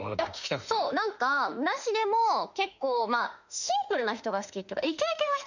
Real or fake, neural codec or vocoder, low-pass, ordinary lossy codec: fake; autoencoder, 48 kHz, 32 numbers a frame, DAC-VAE, trained on Japanese speech; 7.2 kHz; none